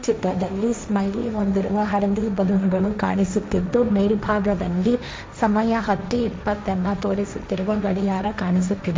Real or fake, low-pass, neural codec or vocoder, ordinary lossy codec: fake; none; codec, 16 kHz, 1.1 kbps, Voila-Tokenizer; none